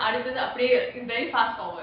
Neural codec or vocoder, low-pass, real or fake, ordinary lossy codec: none; 5.4 kHz; real; none